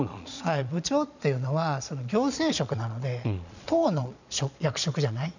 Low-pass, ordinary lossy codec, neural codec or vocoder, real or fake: 7.2 kHz; none; vocoder, 22.05 kHz, 80 mel bands, Vocos; fake